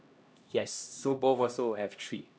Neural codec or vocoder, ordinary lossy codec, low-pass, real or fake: codec, 16 kHz, 1 kbps, X-Codec, HuBERT features, trained on LibriSpeech; none; none; fake